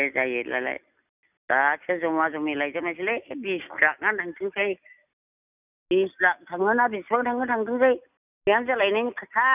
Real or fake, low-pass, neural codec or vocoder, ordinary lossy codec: real; 3.6 kHz; none; none